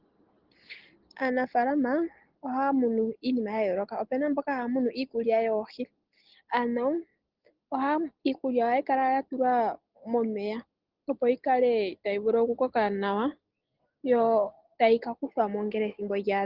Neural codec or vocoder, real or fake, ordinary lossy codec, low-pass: none; real; Opus, 16 kbps; 5.4 kHz